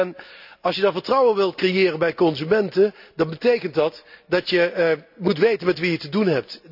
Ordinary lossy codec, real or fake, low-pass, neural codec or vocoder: none; real; 5.4 kHz; none